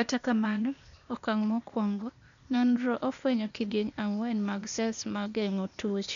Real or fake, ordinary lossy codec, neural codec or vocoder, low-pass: fake; MP3, 96 kbps; codec, 16 kHz, 0.8 kbps, ZipCodec; 7.2 kHz